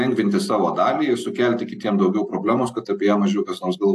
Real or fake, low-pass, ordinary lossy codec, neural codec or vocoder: real; 14.4 kHz; AAC, 64 kbps; none